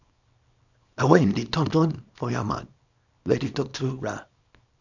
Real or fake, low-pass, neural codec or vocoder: fake; 7.2 kHz; codec, 24 kHz, 0.9 kbps, WavTokenizer, small release